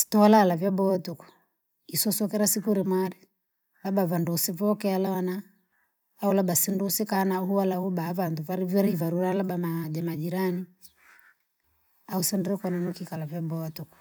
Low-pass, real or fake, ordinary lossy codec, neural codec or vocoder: none; fake; none; vocoder, 44.1 kHz, 128 mel bands every 256 samples, BigVGAN v2